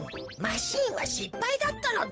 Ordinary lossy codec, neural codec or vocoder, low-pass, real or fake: none; codec, 16 kHz, 8 kbps, FunCodec, trained on Chinese and English, 25 frames a second; none; fake